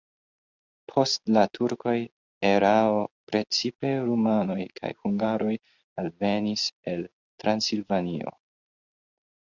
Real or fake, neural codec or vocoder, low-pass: real; none; 7.2 kHz